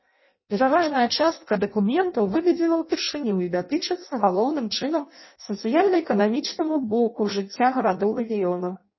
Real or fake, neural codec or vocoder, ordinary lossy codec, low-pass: fake; codec, 16 kHz in and 24 kHz out, 0.6 kbps, FireRedTTS-2 codec; MP3, 24 kbps; 7.2 kHz